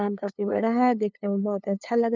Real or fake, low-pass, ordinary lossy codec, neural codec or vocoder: fake; 7.2 kHz; none; codec, 16 kHz, 2 kbps, FunCodec, trained on LibriTTS, 25 frames a second